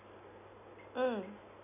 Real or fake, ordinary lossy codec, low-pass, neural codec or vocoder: real; none; 3.6 kHz; none